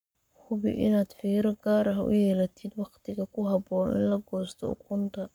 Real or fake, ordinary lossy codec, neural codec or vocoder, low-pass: fake; none; codec, 44.1 kHz, 7.8 kbps, Pupu-Codec; none